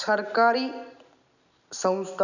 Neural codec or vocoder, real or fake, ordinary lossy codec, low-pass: none; real; none; 7.2 kHz